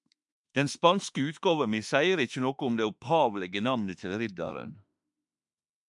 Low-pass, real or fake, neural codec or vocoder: 10.8 kHz; fake; autoencoder, 48 kHz, 32 numbers a frame, DAC-VAE, trained on Japanese speech